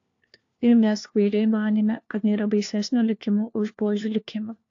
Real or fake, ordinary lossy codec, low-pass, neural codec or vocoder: fake; AAC, 48 kbps; 7.2 kHz; codec, 16 kHz, 1 kbps, FunCodec, trained on LibriTTS, 50 frames a second